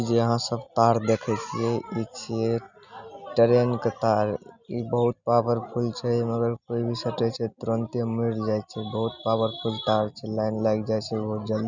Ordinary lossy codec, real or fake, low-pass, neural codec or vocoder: none; real; 7.2 kHz; none